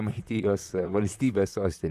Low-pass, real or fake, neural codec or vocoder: 14.4 kHz; fake; vocoder, 44.1 kHz, 128 mel bands, Pupu-Vocoder